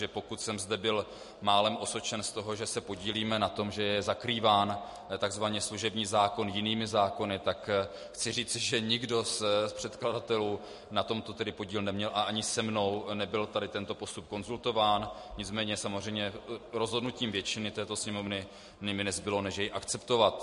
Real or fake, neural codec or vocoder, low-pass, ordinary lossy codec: real; none; 14.4 kHz; MP3, 48 kbps